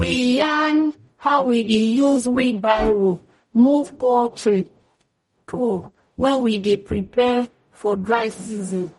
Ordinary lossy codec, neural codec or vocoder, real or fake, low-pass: MP3, 48 kbps; codec, 44.1 kHz, 0.9 kbps, DAC; fake; 19.8 kHz